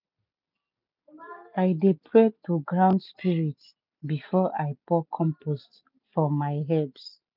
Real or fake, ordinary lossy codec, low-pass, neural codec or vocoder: real; none; 5.4 kHz; none